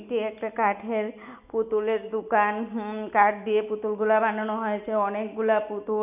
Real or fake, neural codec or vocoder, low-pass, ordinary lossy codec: real; none; 3.6 kHz; none